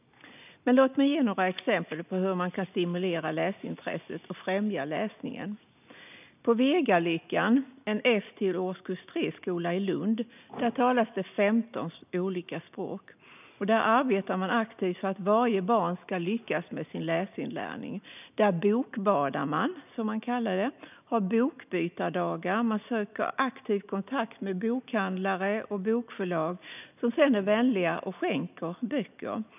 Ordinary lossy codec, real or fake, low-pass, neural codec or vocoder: none; real; 3.6 kHz; none